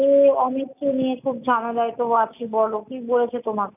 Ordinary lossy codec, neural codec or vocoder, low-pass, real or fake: none; none; 3.6 kHz; real